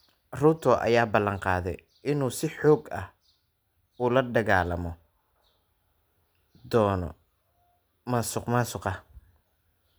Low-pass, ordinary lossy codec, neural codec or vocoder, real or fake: none; none; none; real